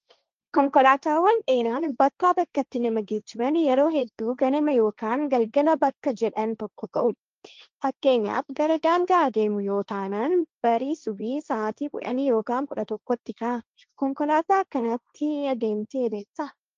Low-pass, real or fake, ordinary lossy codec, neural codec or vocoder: 7.2 kHz; fake; Opus, 24 kbps; codec, 16 kHz, 1.1 kbps, Voila-Tokenizer